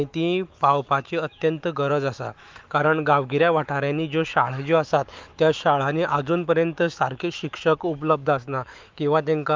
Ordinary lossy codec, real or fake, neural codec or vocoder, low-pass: Opus, 24 kbps; fake; autoencoder, 48 kHz, 128 numbers a frame, DAC-VAE, trained on Japanese speech; 7.2 kHz